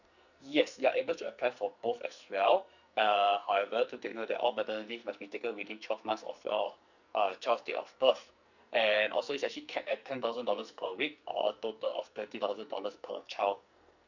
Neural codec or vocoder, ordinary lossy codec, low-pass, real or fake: codec, 44.1 kHz, 2.6 kbps, SNAC; none; 7.2 kHz; fake